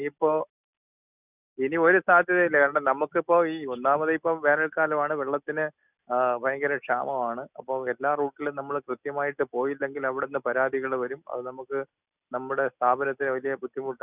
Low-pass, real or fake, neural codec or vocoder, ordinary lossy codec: 3.6 kHz; real; none; none